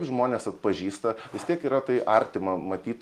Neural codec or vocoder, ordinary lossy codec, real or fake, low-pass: none; Opus, 24 kbps; real; 14.4 kHz